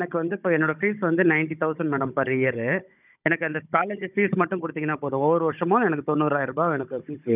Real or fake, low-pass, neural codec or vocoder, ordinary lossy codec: fake; 3.6 kHz; codec, 16 kHz, 16 kbps, FunCodec, trained on Chinese and English, 50 frames a second; none